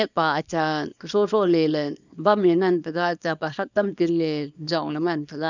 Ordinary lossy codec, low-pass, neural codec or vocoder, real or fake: none; 7.2 kHz; codec, 24 kHz, 0.9 kbps, WavTokenizer, small release; fake